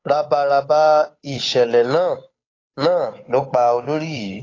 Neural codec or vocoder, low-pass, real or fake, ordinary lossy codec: codec, 16 kHz, 8 kbps, FunCodec, trained on Chinese and English, 25 frames a second; 7.2 kHz; fake; AAC, 32 kbps